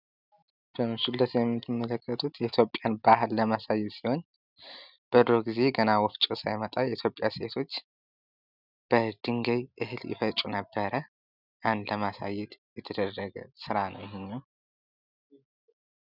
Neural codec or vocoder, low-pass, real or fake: none; 5.4 kHz; real